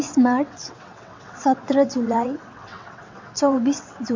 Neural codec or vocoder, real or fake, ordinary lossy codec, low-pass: vocoder, 22.05 kHz, 80 mel bands, WaveNeXt; fake; MP3, 48 kbps; 7.2 kHz